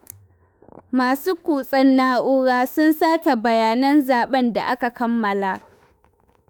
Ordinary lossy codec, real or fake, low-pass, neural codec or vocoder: none; fake; none; autoencoder, 48 kHz, 32 numbers a frame, DAC-VAE, trained on Japanese speech